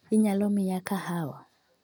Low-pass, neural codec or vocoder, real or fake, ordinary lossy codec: 19.8 kHz; none; real; none